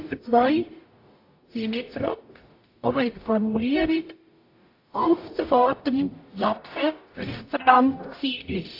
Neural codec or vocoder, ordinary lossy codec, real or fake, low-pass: codec, 44.1 kHz, 0.9 kbps, DAC; AAC, 32 kbps; fake; 5.4 kHz